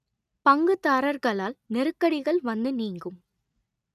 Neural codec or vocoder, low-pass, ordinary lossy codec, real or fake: none; 14.4 kHz; none; real